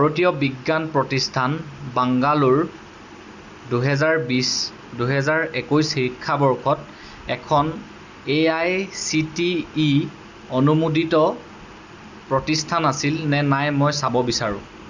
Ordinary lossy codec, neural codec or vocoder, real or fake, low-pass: Opus, 64 kbps; none; real; 7.2 kHz